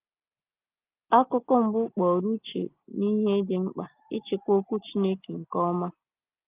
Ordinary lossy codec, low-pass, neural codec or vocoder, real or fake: Opus, 24 kbps; 3.6 kHz; none; real